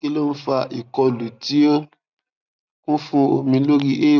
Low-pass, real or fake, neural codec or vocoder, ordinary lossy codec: 7.2 kHz; real; none; none